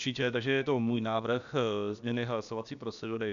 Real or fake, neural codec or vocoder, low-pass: fake; codec, 16 kHz, about 1 kbps, DyCAST, with the encoder's durations; 7.2 kHz